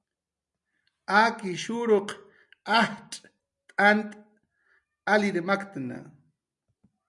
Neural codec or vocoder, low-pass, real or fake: none; 10.8 kHz; real